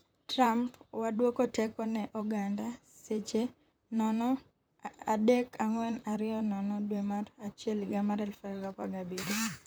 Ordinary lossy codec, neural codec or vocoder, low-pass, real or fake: none; vocoder, 44.1 kHz, 128 mel bands, Pupu-Vocoder; none; fake